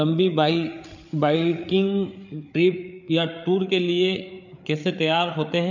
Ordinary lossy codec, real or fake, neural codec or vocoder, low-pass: none; fake; codec, 16 kHz, 8 kbps, FreqCodec, larger model; 7.2 kHz